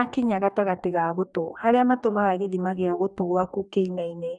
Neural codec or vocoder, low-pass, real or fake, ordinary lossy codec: codec, 44.1 kHz, 2.6 kbps, DAC; 10.8 kHz; fake; none